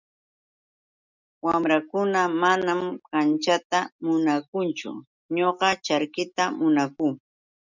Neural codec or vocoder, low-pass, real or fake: none; 7.2 kHz; real